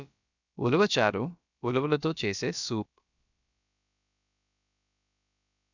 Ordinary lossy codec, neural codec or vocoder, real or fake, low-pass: none; codec, 16 kHz, about 1 kbps, DyCAST, with the encoder's durations; fake; 7.2 kHz